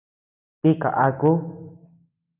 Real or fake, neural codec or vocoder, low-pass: real; none; 3.6 kHz